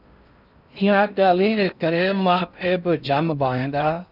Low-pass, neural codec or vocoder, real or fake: 5.4 kHz; codec, 16 kHz in and 24 kHz out, 0.6 kbps, FocalCodec, streaming, 4096 codes; fake